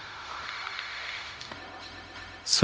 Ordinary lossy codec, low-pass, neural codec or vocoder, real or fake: none; none; codec, 16 kHz, 0.4 kbps, LongCat-Audio-Codec; fake